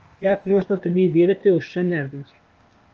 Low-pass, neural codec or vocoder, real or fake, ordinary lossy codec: 7.2 kHz; codec, 16 kHz, 0.8 kbps, ZipCodec; fake; Opus, 24 kbps